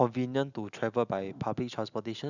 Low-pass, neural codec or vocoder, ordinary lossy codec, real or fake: 7.2 kHz; none; none; real